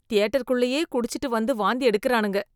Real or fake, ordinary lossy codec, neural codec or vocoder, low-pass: real; none; none; 19.8 kHz